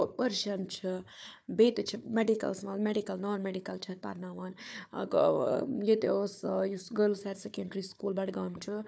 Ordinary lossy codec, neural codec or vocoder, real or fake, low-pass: none; codec, 16 kHz, 4 kbps, FunCodec, trained on Chinese and English, 50 frames a second; fake; none